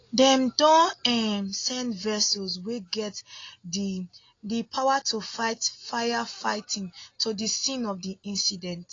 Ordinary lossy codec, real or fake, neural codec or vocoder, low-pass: AAC, 32 kbps; real; none; 7.2 kHz